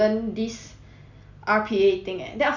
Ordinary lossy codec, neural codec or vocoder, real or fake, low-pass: none; none; real; 7.2 kHz